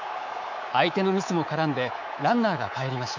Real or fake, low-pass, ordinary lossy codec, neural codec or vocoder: fake; 7.2 kHz; none; codec, 24 kHz, 3.1 kbps, DualCodec